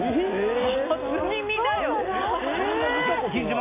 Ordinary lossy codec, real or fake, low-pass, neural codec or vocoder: AAC, 24 kbps; real; 3.6 kHz; none